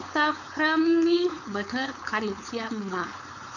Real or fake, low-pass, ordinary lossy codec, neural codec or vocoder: fake; 7.2 kHz; none; codec, 16 kHz, 4.8 kbps, FACodec